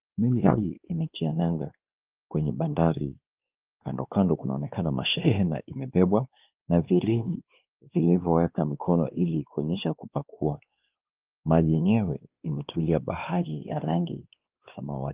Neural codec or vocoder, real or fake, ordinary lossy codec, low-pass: codec, 16 kHz, 2 kbps, X-Codec, WavLM features, trained on Multilingual LibriSpeech; fake; Opus, 32 kbps; 3.6 kHz